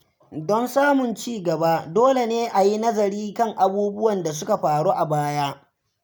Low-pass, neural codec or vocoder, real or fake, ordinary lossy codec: none; none; real; none